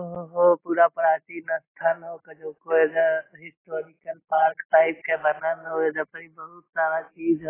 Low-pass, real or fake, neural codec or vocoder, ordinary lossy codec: 3.6 kHz; real; none; AAC, 16 kbps